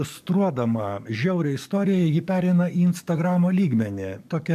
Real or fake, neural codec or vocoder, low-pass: fake; codec, 44.1 kHz, 7.8 kbps, DAC; 14.4 kHz